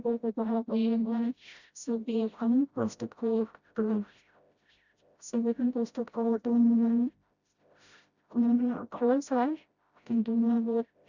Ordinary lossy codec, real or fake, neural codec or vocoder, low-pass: Opus, 64 kbps; fake; codec, 16 kHz, 0.5 kbps, FreqCodec, smaller model; 7.2 kHz